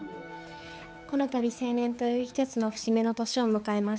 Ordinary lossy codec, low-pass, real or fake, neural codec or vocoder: none; none; fake; codec, 16 kHz, 4 kbps, X-Codec, HuBERT features, trained on balanced general audio